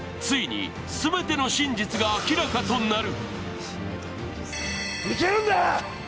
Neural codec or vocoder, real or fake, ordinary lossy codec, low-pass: none; real; none; none